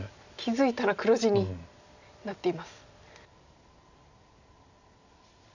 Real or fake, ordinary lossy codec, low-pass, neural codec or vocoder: real; none; 7.2 kHz; none